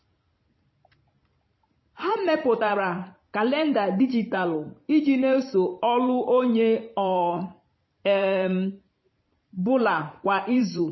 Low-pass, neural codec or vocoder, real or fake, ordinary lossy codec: 7.2 kHz; vocoder, 44.1 kHz, 128 mel bands every 512 samples, BigVGAN v2; fake; MP3, 24 kbps